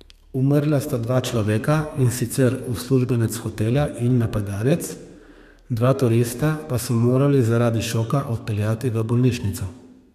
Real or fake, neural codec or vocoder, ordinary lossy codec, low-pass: fake; codec, 32 kHz, 1.9 kbps, SNAC; none; 14.4 kHz